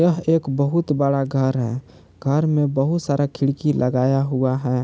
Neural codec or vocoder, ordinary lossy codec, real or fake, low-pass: none; none; real; none